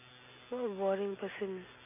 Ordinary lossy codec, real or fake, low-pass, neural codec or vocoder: none; real; 3.6 kHz; none